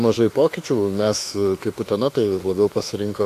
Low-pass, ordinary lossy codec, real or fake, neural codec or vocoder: 14.4 kHz; AAC, 64 kbps; fake; autoencoder, 48 kHz, 32 numbers a frame, DAC-VAE, trained on Japanese speech